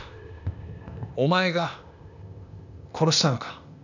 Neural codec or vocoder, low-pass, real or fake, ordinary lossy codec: codec, 16 kHz, 0.8 kbps, ZipCodec; 7.2 kHz; fake; none